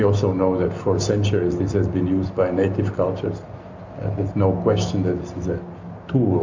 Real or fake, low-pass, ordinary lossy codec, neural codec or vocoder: real; 7.2 kHz; MP3, 64 kbps; none